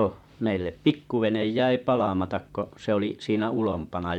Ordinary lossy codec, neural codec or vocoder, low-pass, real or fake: none; vocoder, 44.1 kHz, 128 mel bands, Pupu-Vocoder; 19.8 kHz; fake